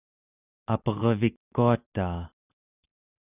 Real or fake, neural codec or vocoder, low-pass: real; none; 3.6 kHz